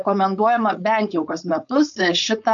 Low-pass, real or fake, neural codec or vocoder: 7.2 kHz; fake; codec, 16 kHz, 4.8 kbps, FACodec